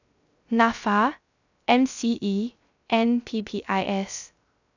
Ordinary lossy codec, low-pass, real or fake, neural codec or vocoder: none; 7.2 kHz; fake; codec, 16 kHz, 0.3 kbps, FocalCodec